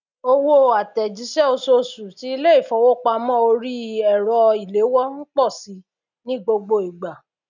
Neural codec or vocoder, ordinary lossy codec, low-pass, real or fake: none; none; 7.2 kHz; real